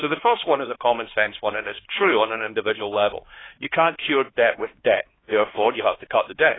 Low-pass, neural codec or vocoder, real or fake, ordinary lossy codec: 7.2 kHz; codec, 16 kHz, 2 kbps, X-Codec, HuBERT features, trained on LibriSpeech; fake; AAC, 16 kbps